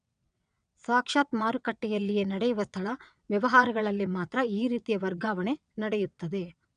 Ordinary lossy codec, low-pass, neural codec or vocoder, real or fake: none; 9.9 kHz; vocoder, 22.05 kHz, 80 mel bands, WaveNeXt; fake